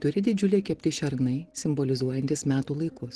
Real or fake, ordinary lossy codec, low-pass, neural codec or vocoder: real; Opus, 16 kbps; 10.8 kHz; none